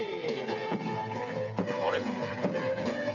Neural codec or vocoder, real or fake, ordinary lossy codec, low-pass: codec, 16 kHz, 8 kbps, FreqCodec, smaller model; fake; none; 7.2 kHz